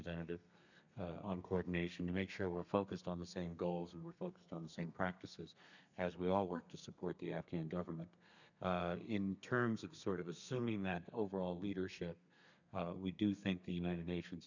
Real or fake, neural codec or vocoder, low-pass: fake; codec, 32 kHz, 1.9 kbps, SNAC; 7.2 kHz